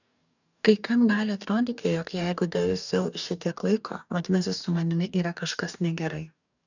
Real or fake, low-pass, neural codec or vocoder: fake; 7.2 kHz; codec, 44.1 kHz, 2.6 kbps, DAC